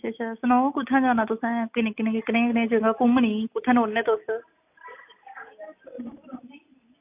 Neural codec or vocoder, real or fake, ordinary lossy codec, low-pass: none; real; AAC, 32 kbps; 3.6 kHz